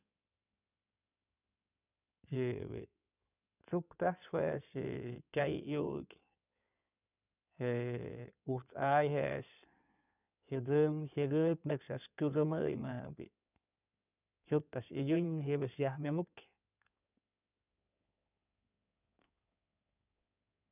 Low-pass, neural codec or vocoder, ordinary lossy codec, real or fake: 3.6 kHz; codec, 16 kHz in and 24 kHz out, 2.2 kbps, FireRedTTS-2 codec; none; fake